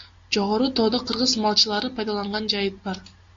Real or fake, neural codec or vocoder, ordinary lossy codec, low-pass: real; none; AAC, 48 kbps; 7.2 kHz